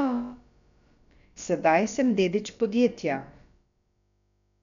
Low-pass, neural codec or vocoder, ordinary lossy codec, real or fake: 7.2 kHz; codec, 16 kHz, about 1 kbps, DyCAST, with the encoder's durations; none; fake